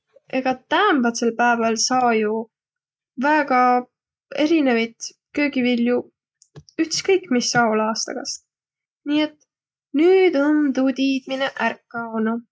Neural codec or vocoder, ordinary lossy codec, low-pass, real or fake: none; none; none; real